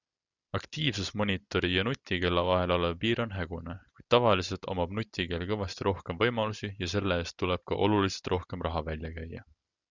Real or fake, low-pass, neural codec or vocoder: real; 7.2 kHz; none